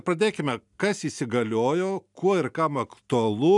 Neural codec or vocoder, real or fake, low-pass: none; real; 10.8 kHz